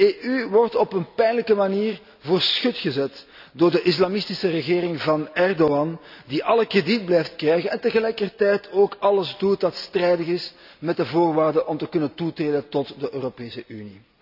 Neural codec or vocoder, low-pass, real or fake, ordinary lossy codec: none; 5.4 kHz; real; none